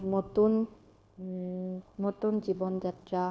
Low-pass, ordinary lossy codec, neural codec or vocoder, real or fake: none; none; codec, 16 kHz, 0.9 kbps, LongCat-Audio-Codec; fake